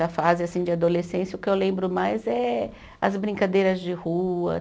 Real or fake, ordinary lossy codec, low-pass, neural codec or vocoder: real; none; none; none